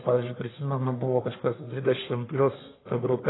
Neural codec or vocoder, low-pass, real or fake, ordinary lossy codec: codec, 44.1 kHz, 1.7 kbps, Pupu-Codec; 7.2 kHz; fake; AAC, 16 kbps